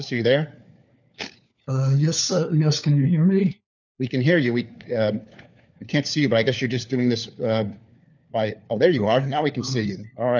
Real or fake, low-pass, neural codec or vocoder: fake; 7.2 kHz; codec, 16 kHz, 4 kbps, FunCodec, trained on LibriTTS, 50 frames a second